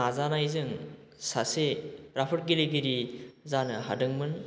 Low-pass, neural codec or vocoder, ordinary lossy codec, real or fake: none; none; none; real